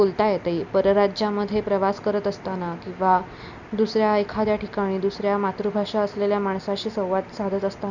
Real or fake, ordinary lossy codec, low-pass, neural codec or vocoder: real; none; 7.2 kHz; none